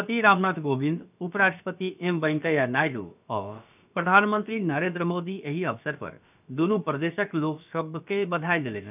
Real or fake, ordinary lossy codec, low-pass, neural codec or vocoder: fake; none; 3.6 kHz; codec, 16 kHz, about 1 kbps, DyCAST, with the encoder's durations